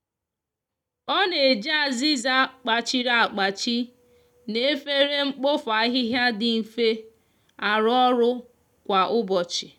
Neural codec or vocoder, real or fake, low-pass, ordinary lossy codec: none; real; 19.8 kHz; none